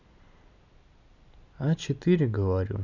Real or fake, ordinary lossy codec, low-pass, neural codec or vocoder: real; none; 7.2 kHz; none